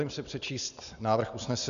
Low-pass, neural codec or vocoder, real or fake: 7.2 kHz; none; real